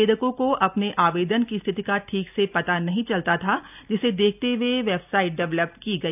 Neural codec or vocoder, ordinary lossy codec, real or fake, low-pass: none; none; real; 3.6 kHz